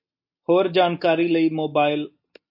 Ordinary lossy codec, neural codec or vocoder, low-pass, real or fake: MP3, 32 kbps; none; 5.4 kHz; real